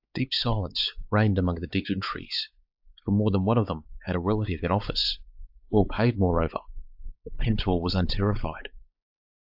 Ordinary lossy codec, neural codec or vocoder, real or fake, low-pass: AAC, 48 kbps; codec, 16 kHz, 4 kbps, X-Codec, WavLM features, trained on Multilingual LibriSpeech; fake; 5.4 kHz